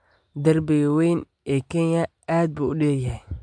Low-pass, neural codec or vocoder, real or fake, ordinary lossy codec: 9.9 kHz; none; real; MP3, 64 kbps